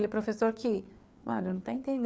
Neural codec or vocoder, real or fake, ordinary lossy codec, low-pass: codec, 16 kHz, 4 kbps, FreqCodec, larger model; fake; none; none